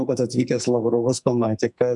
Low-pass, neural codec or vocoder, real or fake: 10.8 kHz; codec, 44.1 kHz, 2.6 kbps, SNAC; fake